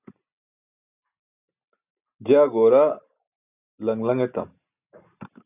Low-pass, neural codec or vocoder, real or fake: 3.6 kHz; none; real